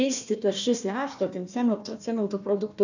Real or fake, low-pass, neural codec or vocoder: fake; 7.2 kHz; codec, 16 kHz, 1 kbps, FunCodec, trained on Chinese and English, 50 frames a second